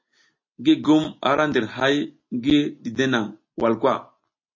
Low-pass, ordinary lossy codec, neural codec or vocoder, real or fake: 7.2 kHz; MP3, 32 kbps; none; real